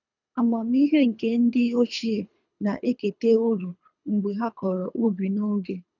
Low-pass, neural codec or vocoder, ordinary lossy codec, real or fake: 7.2 kHz; codec, 24 kHz, 3 kbps, HILCodec; none; fake